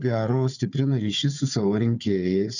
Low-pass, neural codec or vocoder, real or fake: 7.2 kHz; codec, 16 kHz, 4 kbps, FunCodec, trained on Chinese and English, 50 frames a second; fake